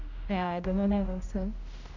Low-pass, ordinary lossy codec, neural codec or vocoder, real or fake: 7.2 kHz; MP3, 64 kbps; codec, 16 kHz, 0.5 kbps, X-Codec, HuBERT features, trained on general audio; fake